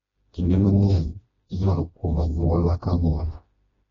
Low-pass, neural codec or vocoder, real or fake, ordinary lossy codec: 7.2 kHz; codec, 16 kHz, 1 kbps, FreqCodec, smaller model; fake; AAC, 24 kbps